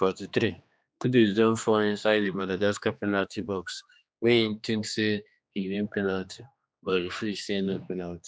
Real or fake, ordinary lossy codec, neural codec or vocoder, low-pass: fake; none; codec, 16 kHz, 2 kbps, X-Codec, HuBERT features, trained on general audio; none